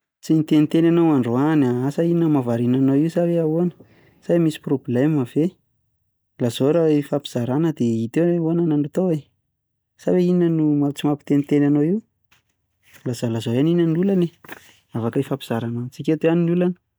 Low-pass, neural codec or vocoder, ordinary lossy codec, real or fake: none; none; none; real